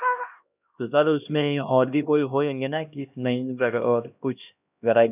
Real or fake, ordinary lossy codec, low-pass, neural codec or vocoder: fake; none; 3.6 kHz; codec, 16 kHz, 1 kbps, X-Codec, HuBERT features, trained on LibriSpeech